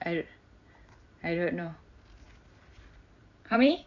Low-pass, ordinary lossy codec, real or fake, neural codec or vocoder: 7.2 kHz; AAC, 48 kbps; fake; vocoder, 44.1 kHz, 128 mel bands every 256 samples, BigVGAN v2